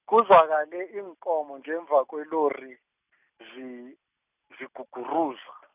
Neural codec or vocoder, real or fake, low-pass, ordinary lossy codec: none; real; 3.6 kHz; none